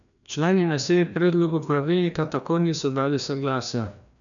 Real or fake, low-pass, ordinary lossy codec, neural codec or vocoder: fake; 7.2 kHz; none; codec, 16 kHz, 1 kbps, FreqCodec, larger model